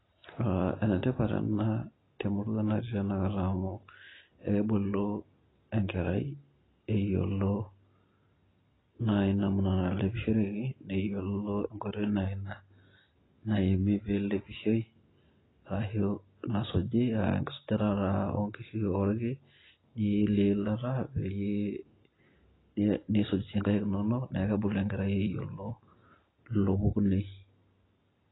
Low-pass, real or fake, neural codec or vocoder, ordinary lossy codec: 7.2 kHz; real; none; AAC, 16 kbps